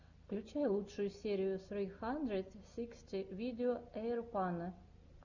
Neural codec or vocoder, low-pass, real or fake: none; 7.2 kHz; real